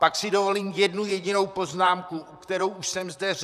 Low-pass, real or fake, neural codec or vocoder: 14.4 kHz; fake; vocoder, 44.1 kHz, 128 mel bands, Pupu-Vocoder